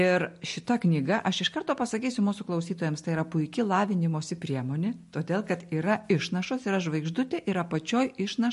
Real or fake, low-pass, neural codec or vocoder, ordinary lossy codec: real; 14.4 kHz; none; MP3, 48 kbps